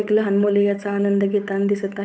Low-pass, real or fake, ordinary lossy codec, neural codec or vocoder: none; fake; none; codec, 16 kHz, 8 kbps, FunCodec, trained on Chinese and English, 25 frames a second